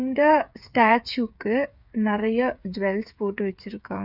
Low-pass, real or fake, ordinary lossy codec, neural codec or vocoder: 5.4 kHz; fake; none; codec, 16 kHz, 8 kbps, FreqCodec, smaller model